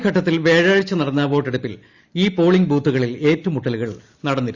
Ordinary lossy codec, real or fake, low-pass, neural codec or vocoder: Opus, 64 kbps; real; 7.2 kHz; none